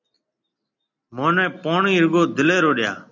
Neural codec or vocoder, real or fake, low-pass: none; real; 7.2 kHz